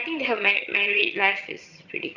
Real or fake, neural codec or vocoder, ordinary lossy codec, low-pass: fake; vocoder, 22.05 kHz, 80 mel bands, HiFi-GAN; none; 7.2 kHz